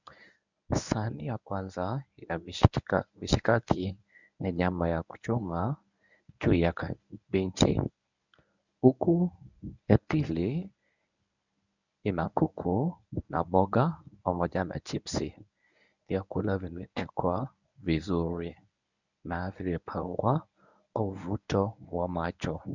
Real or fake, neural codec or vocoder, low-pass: fake; codec, 24 kHz, 0.9 kbps, WavTokenizer, medium speech release version 1; 7.2 kHz